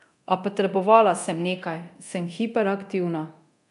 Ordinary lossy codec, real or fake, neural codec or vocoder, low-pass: none; fake; codec, 24 kHz, 0.9 kbps, DualCodec; 10.8 kHz